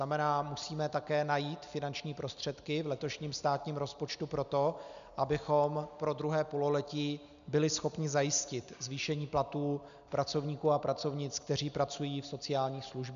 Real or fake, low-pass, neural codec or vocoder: real; 7.2 kHz; none